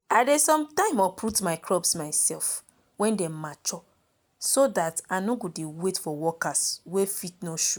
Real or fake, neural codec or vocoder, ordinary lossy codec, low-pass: real; none; none; none